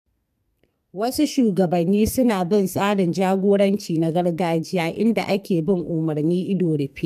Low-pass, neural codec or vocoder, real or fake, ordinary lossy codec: 14.4 kHz; codec, 44.1 kHz, 2.6 kbps, SNAC; fake; MP3, 96 kbps